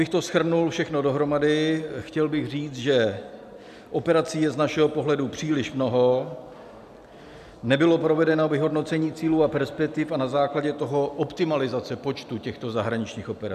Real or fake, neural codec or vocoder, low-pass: real; none; 14.4 kHz